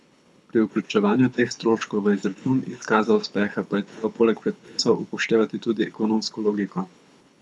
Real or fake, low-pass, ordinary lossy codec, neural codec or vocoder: fake; none; none; codec, 24 kHz, 6 kbps, HILCodec